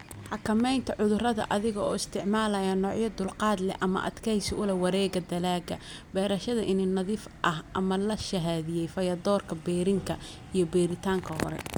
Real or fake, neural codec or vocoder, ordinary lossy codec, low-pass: real; none; none; none